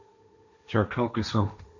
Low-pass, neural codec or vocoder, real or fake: 7.2 kHz; codec, 16 kHz, 1.1 kbps, Voila-Tokenizer; fake